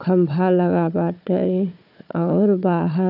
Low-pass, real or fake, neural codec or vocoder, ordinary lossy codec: 5.4 kHz; fake; codec, 16 kHz, 4 kbps, FunCodec, trained on Chinese and English, 50 frames a second; none